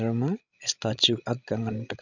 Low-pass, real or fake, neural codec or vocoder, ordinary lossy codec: 7.2 kHz; fake; codec, 16 kHz, 16 kbps, FreqCodec, larger model; none